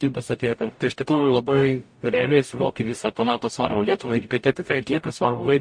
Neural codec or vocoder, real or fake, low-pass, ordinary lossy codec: codec, 44.1 kHz, 0.9 kbps, DAC; fake; 9.9 kHz; MP3, 48 kbps